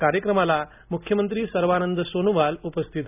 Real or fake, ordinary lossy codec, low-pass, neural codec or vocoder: real; none; 3.6 kHz; none